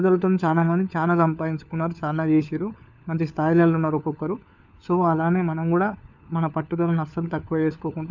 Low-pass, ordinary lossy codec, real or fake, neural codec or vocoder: 7.2 kHz; none; fake; codec, 16 kHz, 4 kbps, FunCodec, trained on LibriTTS, 50 frames a second